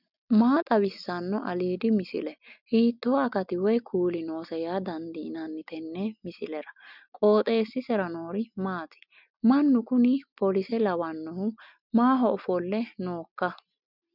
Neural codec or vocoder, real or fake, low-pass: none; real; 5.4 kHz